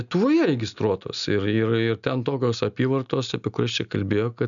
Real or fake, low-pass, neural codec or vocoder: real; 7.2 kHz; none